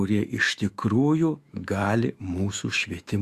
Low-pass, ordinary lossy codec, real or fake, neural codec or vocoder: 14.4 kHz; Opus, 64 kbps; fake; autoencoder, 48 kHz, 128 numbers a frame, DAC-VAE, trained on Japanese speech